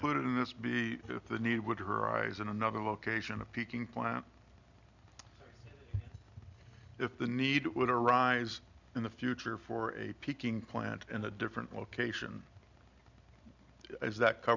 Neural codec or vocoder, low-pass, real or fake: none; 7.2 kHz; real